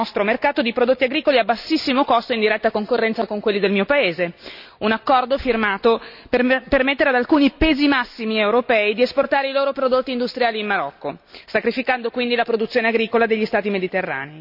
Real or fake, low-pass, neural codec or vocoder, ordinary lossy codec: real; 5.4 kHz; none; none